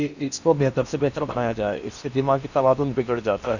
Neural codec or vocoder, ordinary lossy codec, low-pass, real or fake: codec, 16 kHz in and 24 kHz out, 0.8 kbps, FocalCodec, streaming, 65536 codes; none; 7.2 kHz; fake